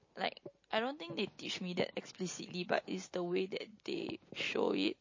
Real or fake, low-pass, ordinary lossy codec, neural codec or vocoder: real; 7.2 kHz; MP3, 32 kbps; none